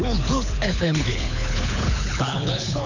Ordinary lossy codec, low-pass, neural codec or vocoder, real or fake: none; 7.2 kHz; codec, 24 kHz, 6 kbps, HILCodec; fake